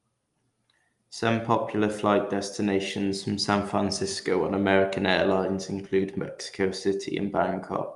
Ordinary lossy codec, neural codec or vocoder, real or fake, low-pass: Opus, 32 kbps; none; real; 10.8 kHz